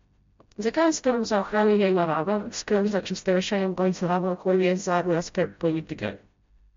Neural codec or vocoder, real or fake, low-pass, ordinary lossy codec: codec, 16 kHz, 0.5 kbps, FreqCodec, smaller model; fake; 7.2 kHz; MP3, 48 kbps